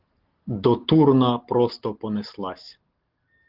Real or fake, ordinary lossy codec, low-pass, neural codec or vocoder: real; Opus, 16 kbps; 5.4 kHz; none